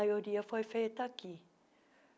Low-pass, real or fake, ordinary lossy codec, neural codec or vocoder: none; real; none; none